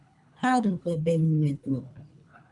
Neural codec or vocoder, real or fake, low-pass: codec, 24 kHz, 3 kbps, HILCodec; fake; 10.8 kHz